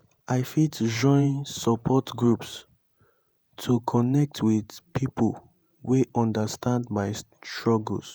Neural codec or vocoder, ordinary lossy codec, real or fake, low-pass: vocoder, 48 kHz, 128 mel bands, Vocos; none; fake; none